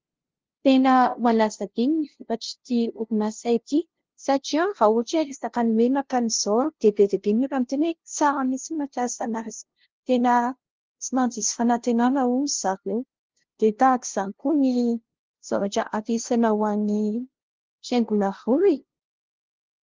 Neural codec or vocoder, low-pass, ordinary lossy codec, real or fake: codec, 16 kHz, 0.5 kbps, FunCodec, trained on LibriTTS, 25 frames a second; 7.2 kHz; Opus, 16 kbps; fake